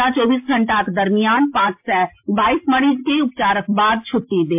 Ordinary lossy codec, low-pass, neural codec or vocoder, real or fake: MP3, 32 kbps; 3.6 kHz; none; real